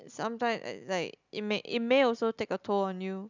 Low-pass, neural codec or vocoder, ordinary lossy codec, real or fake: 7.2 kHz; none; none; real